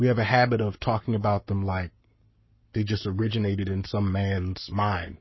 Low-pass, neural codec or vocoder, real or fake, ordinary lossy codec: 7.2 kHz; codec, 44.1 kHz, 7.8 kbps, Pupu-Codec; fake; MP3, 24 kbps